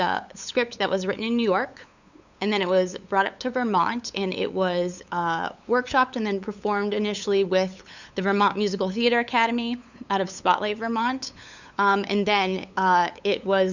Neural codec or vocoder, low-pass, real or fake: codec, 16 kHz, 8 kbps, FunCodec, trained on LibriTTS, 25 frames a second; 7.2 kHz; fake